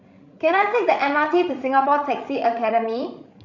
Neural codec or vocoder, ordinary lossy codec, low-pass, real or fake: codec, 16 kHz, 16 kbps, FreqCodec, larger model; none; 7.2 kHz; fake